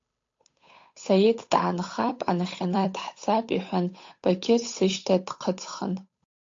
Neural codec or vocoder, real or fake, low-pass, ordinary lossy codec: codec, 16 kHz, 8 kbps, FunCodec, trained on Chinese and English, 25 frames a second; fake; 7.2 kHz; AAC, 48 kbps